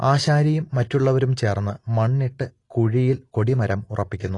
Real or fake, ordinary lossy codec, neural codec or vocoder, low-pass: real; AAC, 32 kbps; none; 10.8 kHz